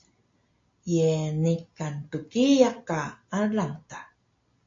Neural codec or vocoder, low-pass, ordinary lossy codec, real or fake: none; 7.2 kHz; AAC, 48 kbps; real